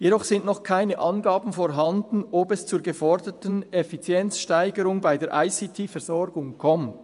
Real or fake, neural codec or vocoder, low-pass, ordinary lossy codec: fake; vocoder, 24 kHz, 100 mel bands, Vocos; 10.8 kHz; none